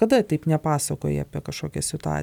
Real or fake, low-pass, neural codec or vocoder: real; 19.8 kHz; none